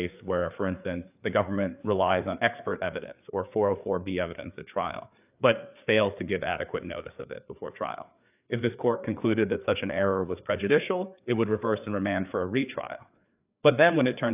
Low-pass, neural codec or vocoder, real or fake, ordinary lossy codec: 3.6 kHz; codec, 16 kHz, 4 kbps, FreqCodec, larger model; fake; AAC, 32 kbps